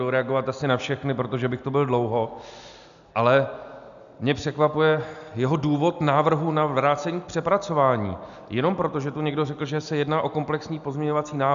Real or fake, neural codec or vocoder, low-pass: real; none; 7.2 kHz